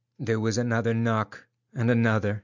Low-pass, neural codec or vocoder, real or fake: 7.2 kHz; none; real